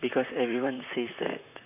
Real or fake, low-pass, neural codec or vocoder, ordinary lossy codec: fake; 3.6 kHz; vocoder, 44.1 kHz, 128 mel bands, Pupu-Vocoder; none